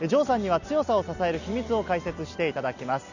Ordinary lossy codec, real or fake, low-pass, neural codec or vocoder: none; real; 7.2 kHz; none